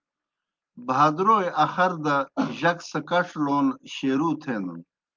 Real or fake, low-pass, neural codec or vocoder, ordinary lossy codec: real; 7.2 kHz; none; Opus, 32 kbps